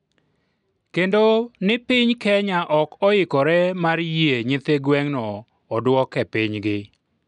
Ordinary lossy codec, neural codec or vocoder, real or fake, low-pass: none; none; real; 10.8 kHz